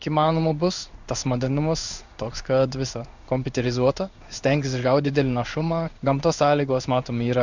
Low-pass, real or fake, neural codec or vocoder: 7.2 kHz; fake; codec, 16 kHz in and 24 kHz out, 1 kbps, XY-Tokenizer